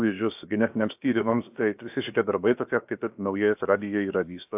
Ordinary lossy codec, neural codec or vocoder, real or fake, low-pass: AAC, 32 kbps; codec, 16 kHz, about 1 kbps, DyCAST, with the encoder's durations; fake; 3.6 kHz